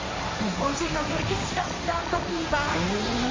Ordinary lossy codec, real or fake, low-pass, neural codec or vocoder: AAC, 32 kbps; fake; 7.2 kHz; codec, 16 kHz, 1.1 kbps, Voila-Tokenizer